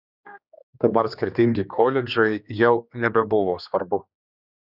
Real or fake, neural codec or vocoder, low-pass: fake; codec, 16 kHz, 2 kbps, X-Codec, HuBERT features, trained on general audio; 5.4 kHz